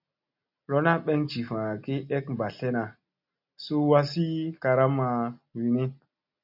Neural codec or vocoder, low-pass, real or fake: none; 5.4 kHz; real